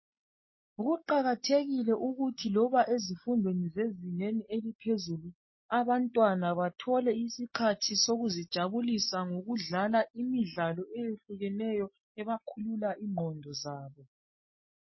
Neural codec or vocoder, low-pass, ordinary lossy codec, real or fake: none; 7.2 kHz; MP3, 24 kbps; real